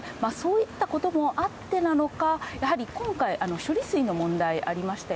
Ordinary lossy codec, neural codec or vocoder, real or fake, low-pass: none; none; real; none